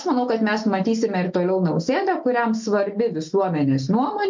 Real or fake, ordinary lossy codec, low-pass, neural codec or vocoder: real; MP3, 64 kbps; 7.2 kHz; none